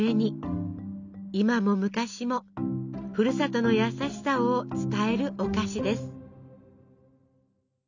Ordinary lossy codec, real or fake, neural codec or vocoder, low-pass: none; real; none; 7.2 kHz